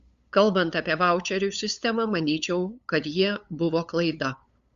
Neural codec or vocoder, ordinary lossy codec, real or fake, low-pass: codec, 16 kHz, 8 kbps, FunCodec, trained on LibriTTS, 25 frames a second; Opus, 64 kbps; fake; 7.2 kHz